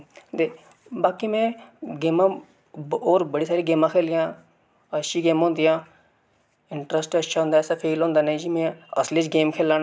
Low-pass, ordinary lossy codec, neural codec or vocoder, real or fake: none; none; none; real